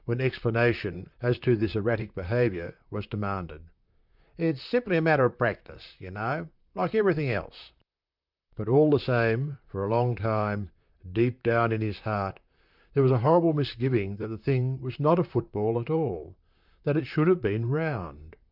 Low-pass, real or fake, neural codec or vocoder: 5.4 kHz; fake; vocoder, 44.1 kHz, 128 mel bands, Pupu-Vocoder